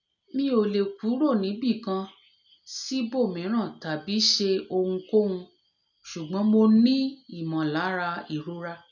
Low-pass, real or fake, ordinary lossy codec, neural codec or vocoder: 7.2 kHz; real; none; none